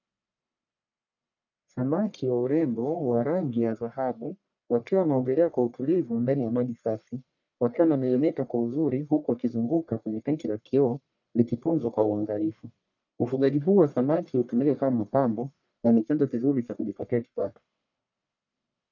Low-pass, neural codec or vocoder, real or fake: 7.2 kHz; codec, 44.1 kHz, 1.7 kbps, Pupu-Codec; fake